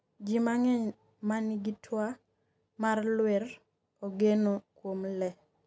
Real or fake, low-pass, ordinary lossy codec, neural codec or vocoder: real; none; none; none